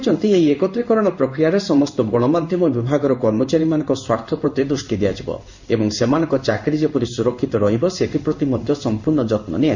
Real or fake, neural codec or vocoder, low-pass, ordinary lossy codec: fake; codec, 16 kHz in and 24 kHz out, 1 kbps, XY-Tokenizer; 7.2 kHz; none